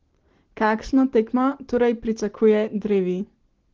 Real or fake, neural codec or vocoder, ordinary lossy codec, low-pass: real; none; Opus, 16 kbps; 7.2 kHz